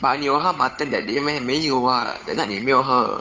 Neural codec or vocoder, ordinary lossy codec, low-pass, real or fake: codec, 16 kHz, 8 kbps, FunCodec, trained on Chinese and English, 25 frames a second; none; none; fake